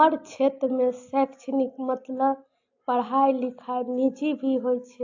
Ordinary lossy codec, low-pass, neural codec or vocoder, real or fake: AAC, 48 kbps; 7.2 kHz; none; real